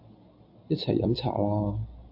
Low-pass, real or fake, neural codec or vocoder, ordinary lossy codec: 5.4 kHz; real; none; AAC, 48 kbps